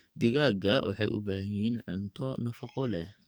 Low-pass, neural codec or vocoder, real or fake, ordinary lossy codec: none; codec, 44.1 kHz, 2.6 kbps, SNAC; fake; none